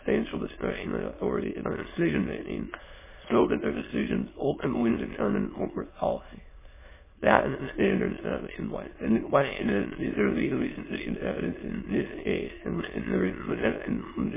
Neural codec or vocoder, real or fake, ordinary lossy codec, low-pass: autoencoder, 22.05 kHz, a latent of 192 numbers a frame, VITS, trained on many speakers; fake; MP3, 16 kbps; 3.6 kHz